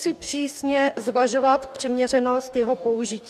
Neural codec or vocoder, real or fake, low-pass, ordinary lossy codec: codec, 44.1 kHz, 2.6 kbps, DAC; fake; 14.4 kHz; MP3, 96 kbps